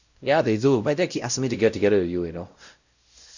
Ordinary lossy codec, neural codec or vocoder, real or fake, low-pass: none; codec, 16 kHz, 0.5 kbps, X-Codec, WavLM features, trained on Multilingual LibriSpeech; fake; 7.2 kHz